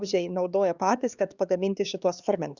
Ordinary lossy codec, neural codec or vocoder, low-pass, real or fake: Opus, 64 kbps; codec, 16 kHz, 2 kbps, X-Codec, HuBERT features, trained on LibriSpeech; 7.2 kHz; fake